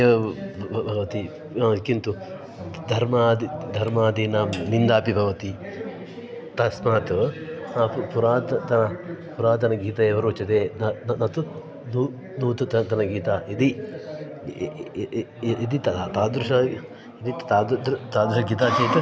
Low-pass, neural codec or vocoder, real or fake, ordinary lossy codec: none; none; real; none